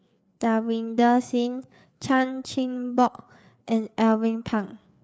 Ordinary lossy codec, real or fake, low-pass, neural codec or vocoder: none; fake; none; codec, 16 kHz, 4 kbps, FreqCodec, larger model